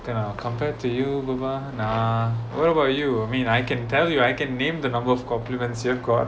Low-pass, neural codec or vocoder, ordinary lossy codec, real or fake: none; none; none; real